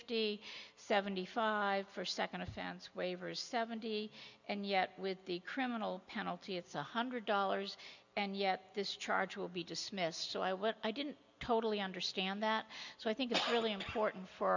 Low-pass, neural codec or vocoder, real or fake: 7.2 kHz; none; real